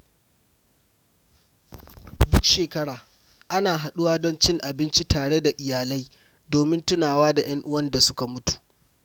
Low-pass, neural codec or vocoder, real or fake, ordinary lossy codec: 19.8 kHz; codec, 44.1 kHz, 7.8 kbps, DAC; fake; none